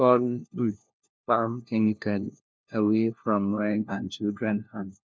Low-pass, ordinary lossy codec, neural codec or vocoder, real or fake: none; none; codec, 16 kHz, 1 kbps, FunCodec, trained on LibriTTS, 50 frames a second; fake